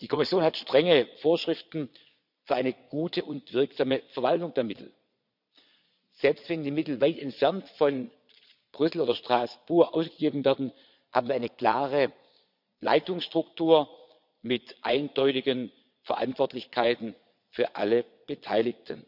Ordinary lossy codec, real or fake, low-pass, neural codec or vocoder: none; fake; 5.4 kHz; vocoder, 22.05 kHz, 80 mel bands, Vocos